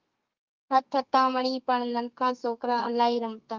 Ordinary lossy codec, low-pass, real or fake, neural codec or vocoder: Opus, 32 kbps; 7.2 kHz; fake; codec, 32 kHz, 1.9 kbps, SNAC